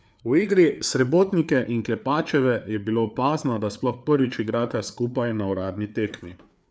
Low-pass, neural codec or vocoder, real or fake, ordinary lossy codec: none; codec, 16 kHz, 4 kbps, FreqCodec, larger model; fake; none